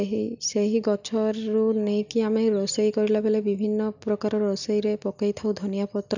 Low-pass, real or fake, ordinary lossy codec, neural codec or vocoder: 7.2 kHz; real; none; none